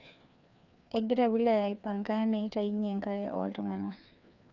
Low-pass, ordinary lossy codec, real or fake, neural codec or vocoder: 7.2 kHz; none; fake; codec, 16 kHz, 2 kbps, FreqCodec, larger model